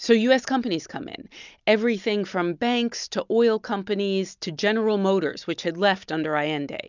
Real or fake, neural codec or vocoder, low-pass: real; none; 7.2 kHz